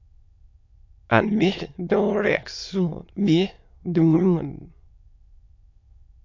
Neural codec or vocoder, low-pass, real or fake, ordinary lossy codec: autoencoder, 22.05 kHz, a latent of 192 numbers a frame, VITS, trained on many speakers; 7.2 kHz; fake; MP3, 48 kbps